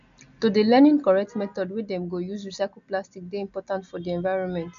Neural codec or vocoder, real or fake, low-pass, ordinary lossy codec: none; real; 7.2 kHz; none